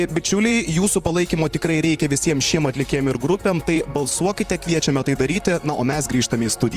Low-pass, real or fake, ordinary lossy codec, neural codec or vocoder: 14.4 kHz; fake; Opus, 32 kbps; vocoder, 44.1 kHz, 128 mel bands, Pupu-Vocoder